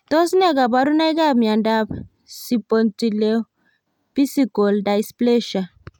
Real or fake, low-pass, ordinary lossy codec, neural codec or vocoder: real; 19.8 kHz; none; none